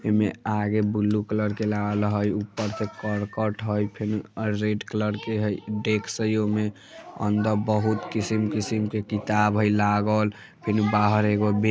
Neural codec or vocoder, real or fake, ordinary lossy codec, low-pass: none; real; none; none